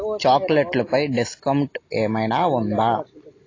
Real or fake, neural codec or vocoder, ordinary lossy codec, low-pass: real; none; AAC, 32 kbps; 7.2 kHz